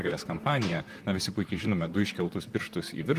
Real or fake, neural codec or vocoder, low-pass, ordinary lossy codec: fake; vocoder, 44.1 kHz, 128 mel bands, Pupu-Vocoder; 14.4 kHz; Opus, 32 kbps